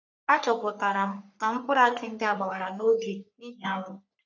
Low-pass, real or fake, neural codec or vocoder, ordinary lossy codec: 7.2 kHz; fake; codec, 44.1 kHz, 3.4 kbps, Pupu-Codec; none